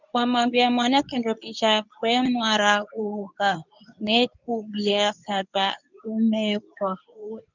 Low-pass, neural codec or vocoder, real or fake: 7.2 kHz; codec, 24 kHz, 0.9 kbps, WavTokenizer, medium speech release version 2; fake